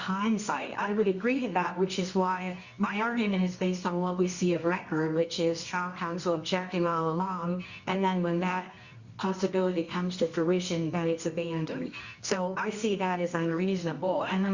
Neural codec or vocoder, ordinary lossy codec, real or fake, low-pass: codec, 24 kHz, 0.9 kbps, WavTokenizer, medium music audio release; Opus, 64 kbps; fake; 7.2 kHz